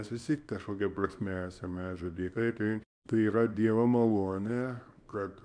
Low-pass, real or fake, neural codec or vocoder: 9.9 kHz; fake; codec, 24 kHz, 0.9 kbps, WavTokenizer, small release